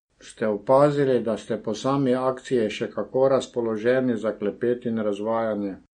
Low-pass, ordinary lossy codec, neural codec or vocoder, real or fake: 9.9 kHz; MP3, 48 kbps; none; real